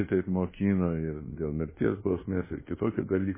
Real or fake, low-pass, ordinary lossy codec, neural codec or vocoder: fake; 3.6 kHz; MP3, 16 kbps; codec, 24 kHz, 1.2 kbps, DualCodec